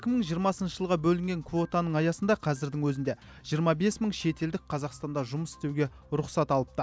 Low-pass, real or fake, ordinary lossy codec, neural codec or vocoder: none; real; none; none